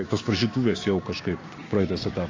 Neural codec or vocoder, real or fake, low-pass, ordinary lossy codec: codec, 16 kHz, 6 kbps, DAC; fake; 7.2 kHz; AAC, 32 kbps